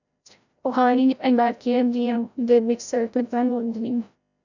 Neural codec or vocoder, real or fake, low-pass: codec, 16 kHz, 0.5 kbps, FreqCodec, larger model; fake; 7.2 kHz